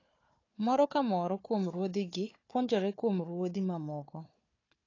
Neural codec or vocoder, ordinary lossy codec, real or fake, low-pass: codec, 16 kHz, 4 kbps, FunCodec, trained on Chinese and English, 50 frames a second; AAC, 32 kbps; fake; 7.2 kHz